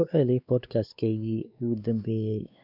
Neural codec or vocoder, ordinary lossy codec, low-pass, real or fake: codec, 16 kHz, 2 kbps, X-Codec, WavLM features, trained on Multilingual LibriSpeech; none; 5.4 kHz; fake